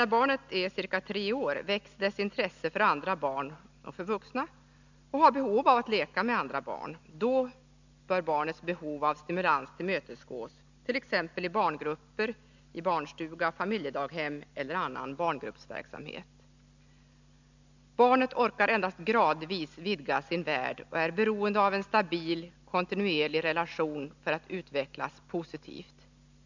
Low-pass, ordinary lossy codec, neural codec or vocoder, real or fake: 7.2 kHz; none; none; real